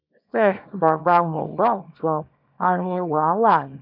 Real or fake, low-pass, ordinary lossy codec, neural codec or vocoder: fake; 5.4 kHz; none; codec, 24 kHz, 0.9 kbps, WavTokenizer, small release